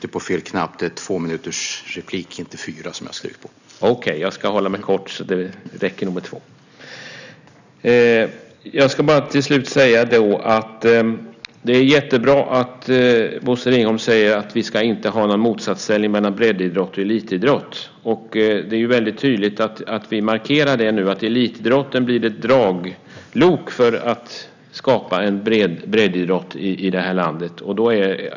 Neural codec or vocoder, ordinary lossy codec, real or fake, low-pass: none; none; real; 7.2 kHz